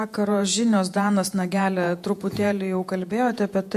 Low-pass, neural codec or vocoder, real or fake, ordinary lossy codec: 14.4 kHz; vocoder, 48 kHz, 128 mel bands, Vocos; fake; MP3, 64 kbps